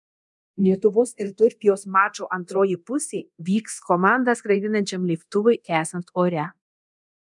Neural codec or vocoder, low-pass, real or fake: codec, 24 kHz, 0.9 kbps, DualCodec; 10.8 kHz; fake